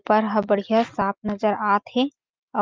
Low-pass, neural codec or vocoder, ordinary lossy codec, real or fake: 7.2 kHz; none; Opus, 24 kbps; real